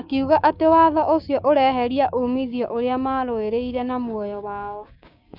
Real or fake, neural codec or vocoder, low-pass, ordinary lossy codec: fake; codec, 16 kHz, 6 kbps, DAC; 5.4 kHz; none